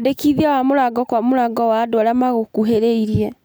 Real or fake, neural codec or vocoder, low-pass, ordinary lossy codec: real; none; none; none